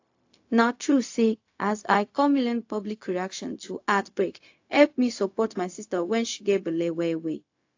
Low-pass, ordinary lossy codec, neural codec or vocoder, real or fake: 7.2 kHz; AAC, 48 kbps; codec, 16 kHz, 0.4 kbps, LongCat-Audio-Codec; fake